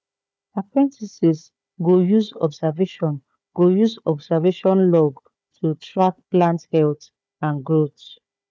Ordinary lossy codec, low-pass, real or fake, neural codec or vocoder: none; none; fake; codec, 16 kHz, 4 kbps, FunCodec, trained on Chinese and English, 50 frames a second